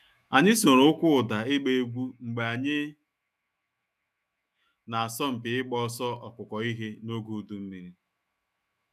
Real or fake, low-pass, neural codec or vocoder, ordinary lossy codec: fake; 14.4 kHz; autoencoder, 48 kHz, 128 numbers a frame, DAC-VAE, trained on Japanese speech; none